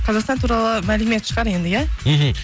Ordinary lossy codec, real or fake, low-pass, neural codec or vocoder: none; real; none; none